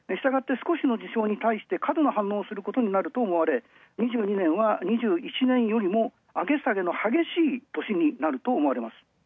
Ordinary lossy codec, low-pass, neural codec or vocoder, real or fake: none; none; none; real